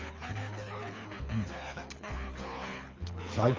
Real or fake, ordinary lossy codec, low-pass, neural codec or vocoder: fake; Opus, 32 kbps; 7.2 kHz; codec, 24 kHz, 6 kbps, HILCodec